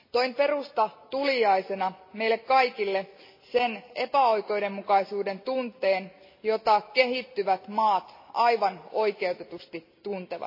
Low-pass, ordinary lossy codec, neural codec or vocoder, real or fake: 5.4 kHz; MP3, 24 kbps; none; real